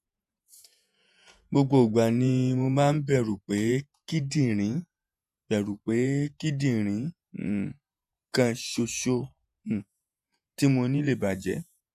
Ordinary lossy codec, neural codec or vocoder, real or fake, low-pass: none; vocoder, 48 kHz, 128 mel bands, Vocos; fake; 14.4 kHz